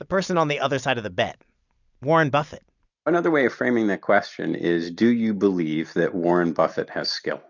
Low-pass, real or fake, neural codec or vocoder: 7.2 kHz; real; none